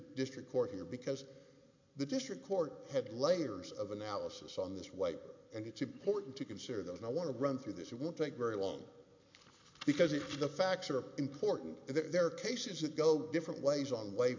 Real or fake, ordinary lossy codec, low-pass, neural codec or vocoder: real; MP3, 48 kbps; 7.2 kHz; none